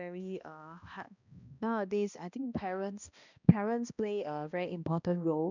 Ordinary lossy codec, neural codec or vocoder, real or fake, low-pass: none; codec, 16 kHz, 1 kbps, X-Codec, HuBERT features, trained on balanced general audio; fake; 7.2 kHz